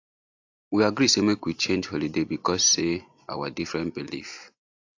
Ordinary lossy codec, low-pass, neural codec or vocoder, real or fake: AAC, 48 kbps; 7.2 kHz; none; real